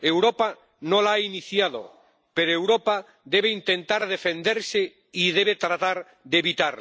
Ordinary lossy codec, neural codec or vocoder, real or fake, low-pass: none; none; real; none